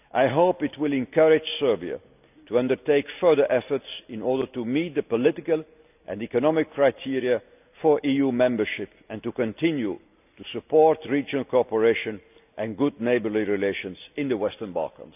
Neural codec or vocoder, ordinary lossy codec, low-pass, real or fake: none; none; 3.6 kHz; real